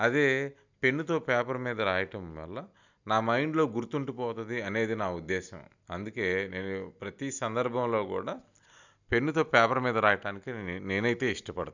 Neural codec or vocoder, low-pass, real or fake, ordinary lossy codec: none; 7.2 kHz; real; none